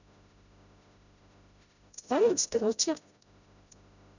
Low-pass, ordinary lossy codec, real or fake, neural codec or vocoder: 7.2 kHz; none; fake; codec, 16 kHz, 0.5 kbps, FreqCodec, smaller model